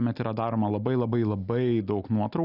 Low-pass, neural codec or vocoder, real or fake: 5.4 kHz; none; real